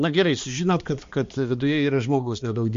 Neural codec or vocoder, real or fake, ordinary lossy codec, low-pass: codec, 16 kHz, 2 kbps, X-Codec, HuBERT features, trained on balanced general audio; fake; MP3, 48 kbps; 7.2 kHz